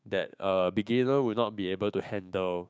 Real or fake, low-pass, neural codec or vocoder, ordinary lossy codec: fake; none; codec, 16 kHz, 6 kbps, DAC; none